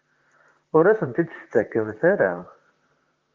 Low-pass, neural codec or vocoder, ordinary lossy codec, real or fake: 7.2 kHz; vocoder, 44.1 kHz, 128 mel bands, Pupu-Vocoder; Opus, 32 kbps; fake